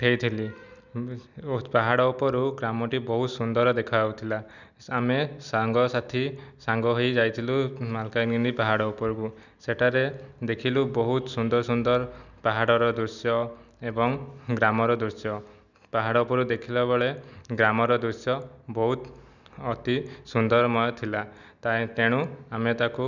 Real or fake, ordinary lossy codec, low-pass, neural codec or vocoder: real; none; 7.2 kHz; none